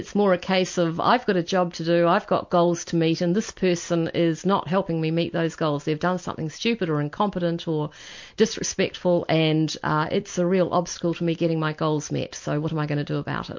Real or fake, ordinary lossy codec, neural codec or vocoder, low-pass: real; MP3, 48 kbps; none; 7.2 kHz